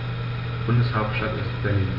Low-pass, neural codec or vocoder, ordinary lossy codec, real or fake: 5.4 kHz; none; none; real